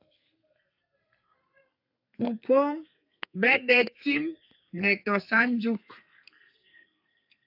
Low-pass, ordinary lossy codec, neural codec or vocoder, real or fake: 5.4 kHz; AAC, 48 kbps; codec, 32 kHz, 1.9 kbps, SNAC; fake